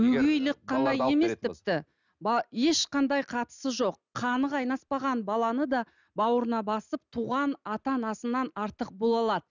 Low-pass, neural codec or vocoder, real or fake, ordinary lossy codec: 7.2 kHz; none; real; none